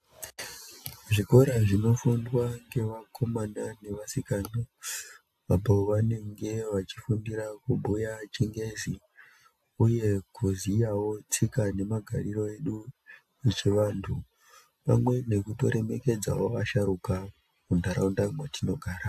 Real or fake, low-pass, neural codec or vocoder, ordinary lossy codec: real; 14.4 kHz; none; AAC, 96 kbps